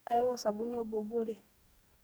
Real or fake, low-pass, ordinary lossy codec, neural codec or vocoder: fake; none; none; codec, 44.1 kHz, 2.6 kbps, DAC